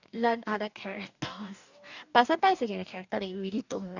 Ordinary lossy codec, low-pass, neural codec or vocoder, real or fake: none; 7.2 kHz; codec, 44.1 kHz, 2.6 kbps, DAC; fake